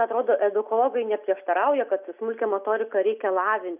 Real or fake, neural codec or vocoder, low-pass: real; none; 3.6 kHz